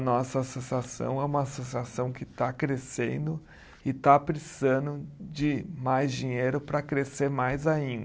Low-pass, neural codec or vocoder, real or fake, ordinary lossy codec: none; none; real; none